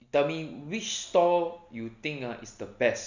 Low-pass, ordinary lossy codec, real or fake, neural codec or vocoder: 7.2 kHz; none; real; none